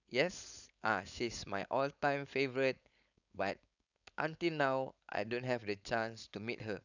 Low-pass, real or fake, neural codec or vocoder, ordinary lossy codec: 7.2 kHz; fake; codec, 16 kHz, 4.8 kbps, FACodec; none